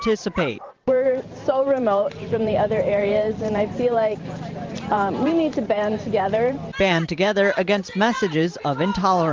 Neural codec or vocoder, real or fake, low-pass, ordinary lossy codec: vocoder, 44.1 kHz, 128 mel bands every 512 samples, BigVGAN v2; fake; 7.2 kHz; Opus, 32 kbps